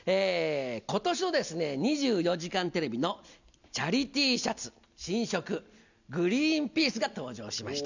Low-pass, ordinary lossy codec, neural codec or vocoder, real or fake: 7.2 kHz; none; none; real